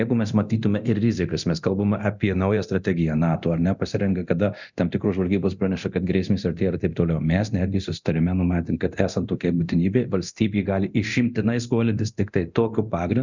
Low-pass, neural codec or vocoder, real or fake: 7.2 kHz; codec, 24 kHz, 0.9 kbps, DualCodec; fake